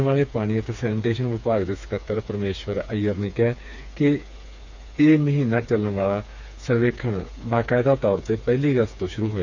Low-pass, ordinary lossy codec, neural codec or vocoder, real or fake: 7.2 kHz; none; codec, 16 kHz, 4 kbps, FreqCodec, smaller model; fake